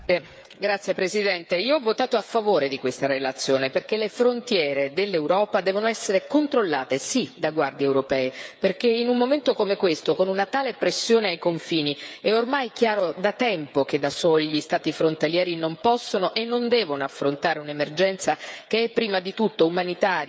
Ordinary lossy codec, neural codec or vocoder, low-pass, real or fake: none; codec, 16 kHz, 8 kbps, FreqCodec, smaller model; none; fake